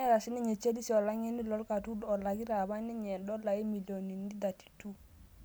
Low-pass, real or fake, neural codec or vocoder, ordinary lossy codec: none; real; none; none